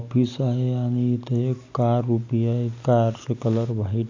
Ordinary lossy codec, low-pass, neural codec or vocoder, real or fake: AAC, 48 kbps; 7.2 kHz; none; real